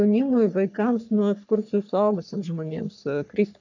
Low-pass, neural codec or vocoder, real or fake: 7.2 kHz; codec, 44.1 kHz, 3.4 kbps, Pupu-Codec; fake